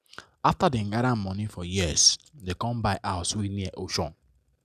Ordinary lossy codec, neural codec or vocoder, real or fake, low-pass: none; none; real; 14.4 kHz